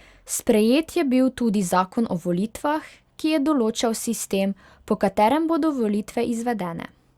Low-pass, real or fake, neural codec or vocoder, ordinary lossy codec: 19.8 kHz; real; none; Opus, 64 kbps